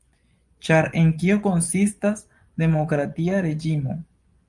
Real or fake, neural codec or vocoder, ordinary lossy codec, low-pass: fake; vocoder, 24 kHz, 100 mel bands, Vocos; Opus, 32 kbps; 10.8 kHz